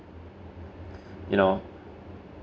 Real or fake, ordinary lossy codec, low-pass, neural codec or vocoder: real; none; none; none